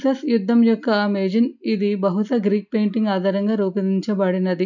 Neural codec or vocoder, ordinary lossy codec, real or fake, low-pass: none; none; real; 7.2 kHz